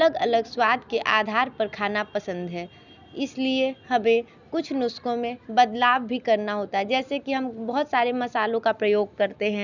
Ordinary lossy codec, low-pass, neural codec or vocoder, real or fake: none; 7.2 kHz; none; real